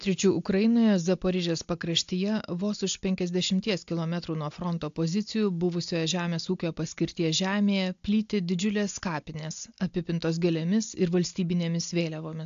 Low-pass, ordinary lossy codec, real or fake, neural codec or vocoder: 7.2 kHz; AAC, 64 kbps; real; none